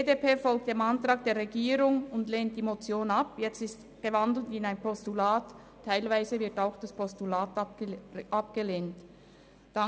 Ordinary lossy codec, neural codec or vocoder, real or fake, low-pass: none; none; real; none